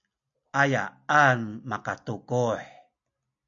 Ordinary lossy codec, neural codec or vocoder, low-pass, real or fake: MP3, 64 kbps; none; 7.2 kHz; real